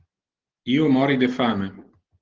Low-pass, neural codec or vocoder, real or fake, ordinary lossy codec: 7.2 kHz; none; real; Opus, 16 kbps